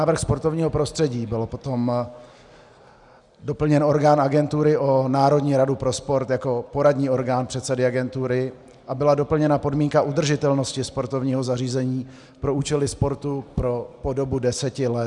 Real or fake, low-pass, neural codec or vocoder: real; 10.8 kHz; none